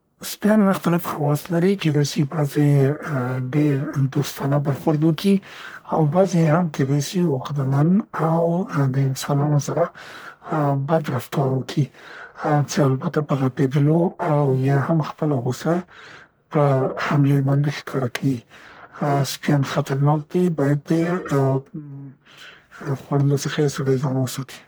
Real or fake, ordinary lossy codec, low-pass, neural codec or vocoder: fake; none; none; codec, 44.1 kHz, 1.7 kbps, Pupu-Codec